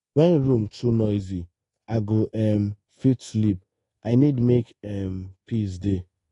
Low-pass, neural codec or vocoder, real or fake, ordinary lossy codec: 10.8 kHz; codec, 24 kHz, 1.2 kbps, DualCodec; fake; AAC, 32 kbps